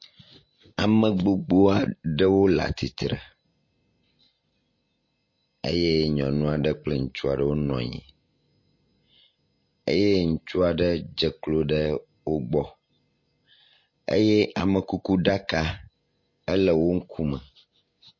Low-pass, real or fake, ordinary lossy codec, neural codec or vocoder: 7.2 kHz; real; MP3, 32 kbps; none